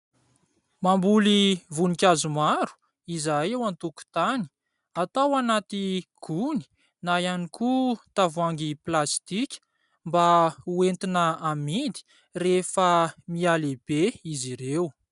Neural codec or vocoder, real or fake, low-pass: none; real; 10.8 kHz